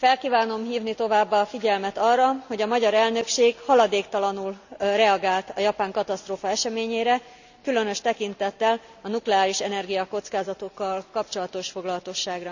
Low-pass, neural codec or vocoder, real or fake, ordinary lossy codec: 7.2 kHz; none; real; none